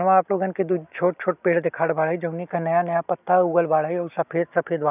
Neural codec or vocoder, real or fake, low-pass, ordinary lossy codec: none; real; 3.6 kHz; none